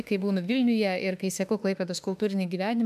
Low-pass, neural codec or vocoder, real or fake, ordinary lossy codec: 14.4 kHz; autoencoder, 48 kHz, 32 numbers a frame, DAC-VAE, trained on Japanese speech; fake; MP3, 96 kbps